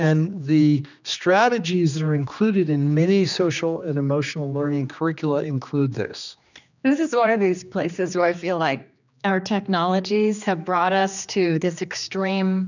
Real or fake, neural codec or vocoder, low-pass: fake; codec, 16 kHz, 2 kbps, X-Codec, HuBERT features, trained on general audio; 7.2 kHz